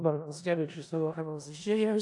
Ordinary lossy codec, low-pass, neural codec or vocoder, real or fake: AAC, 64 kbps; 10.8 kHz; codec, 16 kHz in and 24 kHz out, 0.4 kbps, LongCat-Audio-Codec, four codebook decoder; fake